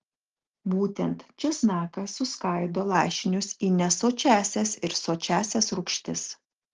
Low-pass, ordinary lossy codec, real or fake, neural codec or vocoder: 7.2 kHz; Opus, 16 kbps; real; none